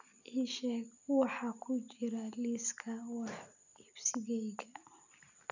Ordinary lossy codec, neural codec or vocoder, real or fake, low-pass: none; none; real; 7.2 kHz